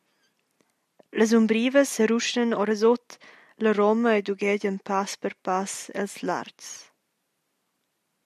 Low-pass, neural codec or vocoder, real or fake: 14.4 kHz; none; real